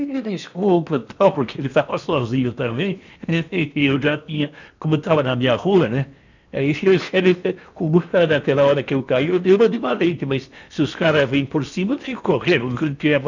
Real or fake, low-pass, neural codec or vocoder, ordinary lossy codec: fake; 7.2 kHz; codec, 16 kHz in and 24 kHz out, 0.8 kbps, FocalCodec, streaming, 65536 codes; none